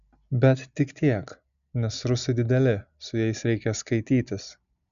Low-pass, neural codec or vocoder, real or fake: 7.2 kHz; none; real